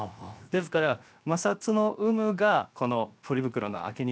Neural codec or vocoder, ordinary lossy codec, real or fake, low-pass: codec, 16 kHz, 0.7 kbps, FocalCodec; none; fake; none